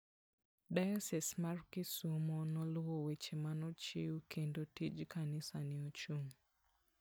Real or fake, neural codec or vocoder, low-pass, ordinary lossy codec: real; none; none; none